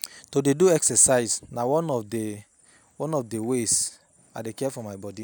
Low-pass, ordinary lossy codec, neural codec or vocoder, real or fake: none; none; none; real